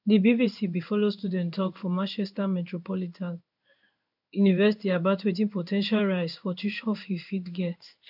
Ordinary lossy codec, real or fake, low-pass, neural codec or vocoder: none; fake; 5.4 kHz; codec, 16 kHz in and 24 kHz out, 1 kbps, XY-Tokenizer